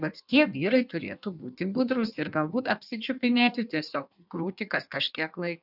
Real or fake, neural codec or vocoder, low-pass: fake; codec, 16 kHz in and 24 kHz out, 1.1 kbps, FireRedTTS-2 codec; 5.4 kHz